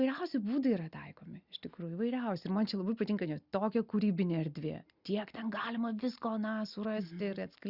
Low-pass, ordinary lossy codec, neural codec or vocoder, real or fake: 5.4 kHz; Opus, 64 kbps; none; real